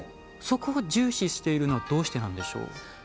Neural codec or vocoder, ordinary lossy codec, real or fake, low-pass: none; none; real; none